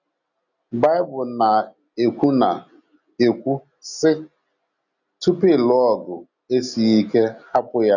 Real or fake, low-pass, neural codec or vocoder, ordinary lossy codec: real; 7.2 kHz; none; none